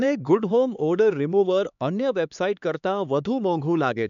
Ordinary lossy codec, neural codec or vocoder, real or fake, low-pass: none; codec, 16 kHz, 4 kbps, X-Codec, HuBERT features, trained on LibriSpeech; fake; 7.2 kHz